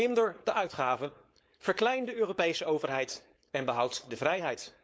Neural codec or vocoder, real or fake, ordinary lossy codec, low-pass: codec, 16 kHz, 4.8 kbps, FACodec; fake; none; none